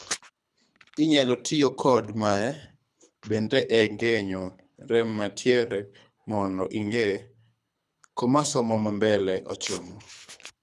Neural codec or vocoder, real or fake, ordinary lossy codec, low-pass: codec, 24 kHz, 3 kbps, HILCodec; fake; none; none